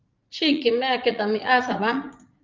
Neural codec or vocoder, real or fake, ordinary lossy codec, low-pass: vocoder, 44.1 kHz, 80 mel bands, Vocos; fake; Opus, 24 kbps; 7.2 kHz